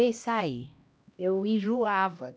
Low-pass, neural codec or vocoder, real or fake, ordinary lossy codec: none; codec, 16 kHz, 1 kbps, X-Codec, HuBERT features, trained on LibriSpeech; fake; none